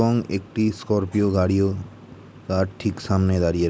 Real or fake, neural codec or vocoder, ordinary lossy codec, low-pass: real; none; none; none